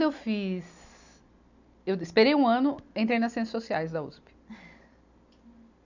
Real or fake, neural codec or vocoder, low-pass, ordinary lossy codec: real; none; 7.2 kHz; none